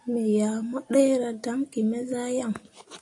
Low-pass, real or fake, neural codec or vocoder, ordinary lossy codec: 10.8 kHz; real; none; AAC, 48 kbps